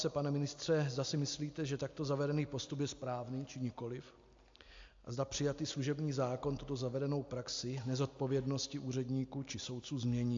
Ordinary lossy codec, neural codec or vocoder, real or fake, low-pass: AAC, 48 kbps; none; real; 7.2 kHz